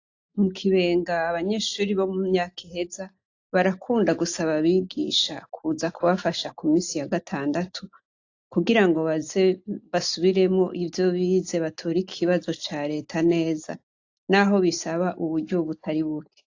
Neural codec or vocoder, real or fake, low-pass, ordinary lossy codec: none; real; 7.2 kHz; AAC, 48 kbps